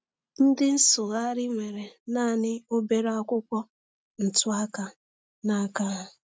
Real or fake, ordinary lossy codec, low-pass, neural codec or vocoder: real; none; none; none